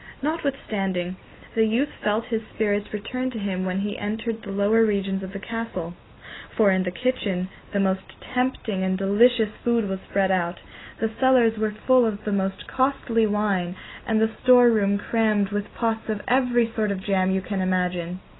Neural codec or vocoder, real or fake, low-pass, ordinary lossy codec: none; real; 7.2 kHz; AAC, 16 kbps